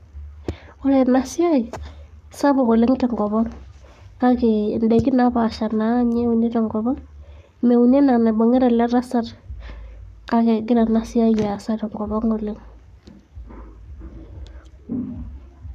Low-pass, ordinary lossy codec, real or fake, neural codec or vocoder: 14.4 kHz; none; fake; codec, 44.1 kHz, 7.8 kbps, Pupu-Codec